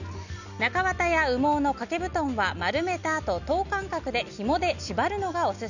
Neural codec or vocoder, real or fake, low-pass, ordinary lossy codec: none; real; 7.2 kHz; none